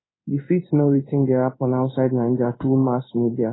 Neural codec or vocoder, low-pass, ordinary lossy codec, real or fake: codec, 16 kHz in and 24 kHz out, 1 kbps, XY-Tokenizer; 7.2 kHz; AAC, 16 kbps; fake